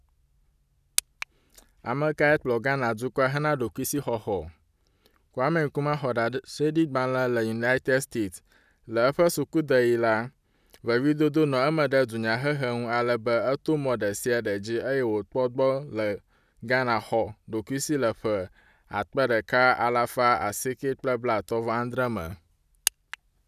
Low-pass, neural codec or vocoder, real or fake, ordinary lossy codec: 14.4 kHz; none; real; none